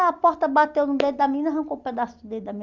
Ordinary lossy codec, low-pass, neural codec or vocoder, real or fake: Opus, 32 kbps; 7.2 kHz; none; real